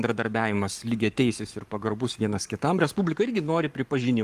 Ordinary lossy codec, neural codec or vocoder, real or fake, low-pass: Opus, 32 kbps; codec, 44.1 kHz, 7.8 kbps, Pupu-Codec; fake; 14.4 kHz